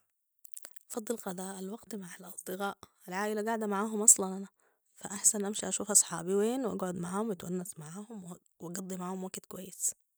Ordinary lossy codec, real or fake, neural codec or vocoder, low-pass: none; real; none; none